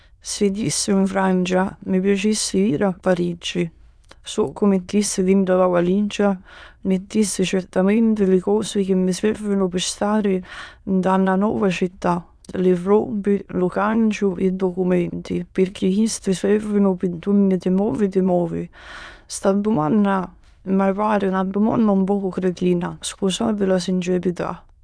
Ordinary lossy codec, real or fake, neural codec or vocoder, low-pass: none; fake; autoencoder, 22.05 kHz, a latent of 192 numbers a frame, VITS, trained on many speakers; none